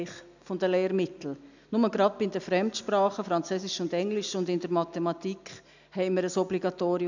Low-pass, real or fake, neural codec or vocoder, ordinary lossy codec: 7.2 kHz; real; none; none